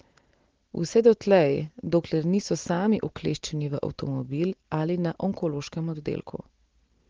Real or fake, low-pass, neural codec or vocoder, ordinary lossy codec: real; 7.2 kHz; none; Opus, 16 kbps